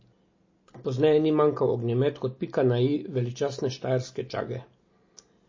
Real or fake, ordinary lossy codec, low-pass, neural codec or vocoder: real; MP3, 32 kbps; 7.2 kHz; none